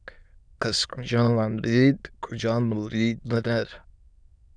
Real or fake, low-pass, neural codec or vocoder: fake; 9.9 kHz; autoencoder, 22.05 kHz, a latent of 192 numbers a frame, VITS, trained on many speakers